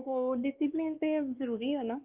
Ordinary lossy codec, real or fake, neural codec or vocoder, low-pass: Opus, 32 kbps; fake; codec, 16 kHz, 2 kbps, X-Codec, WavLM features, trained on Multilingual LibriSpeech; 3.6 kHz